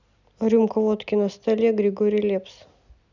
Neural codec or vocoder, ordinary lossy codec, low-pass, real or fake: none; none; 7.2 kHz; real